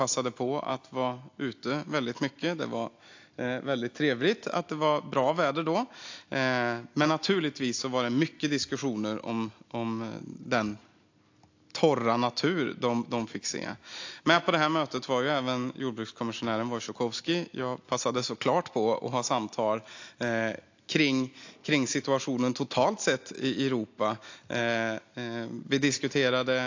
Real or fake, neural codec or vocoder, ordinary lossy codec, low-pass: real; none; AAC, 48 kbps; 7.2 kHz